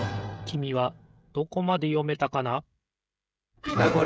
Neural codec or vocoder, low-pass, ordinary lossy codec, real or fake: codec, 16 kHz, 16 kbps, FreqCodec, smaller model; none; none; fake